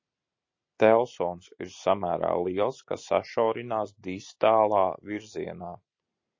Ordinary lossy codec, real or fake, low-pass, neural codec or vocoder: MP3, 32 kbps; real; 7.2 kHz; none